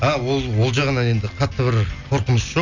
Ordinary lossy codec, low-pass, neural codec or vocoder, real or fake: none; 7.2 kHz; none; real